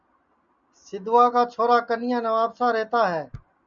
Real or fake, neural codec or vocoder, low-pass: real; none; 7.2 kHz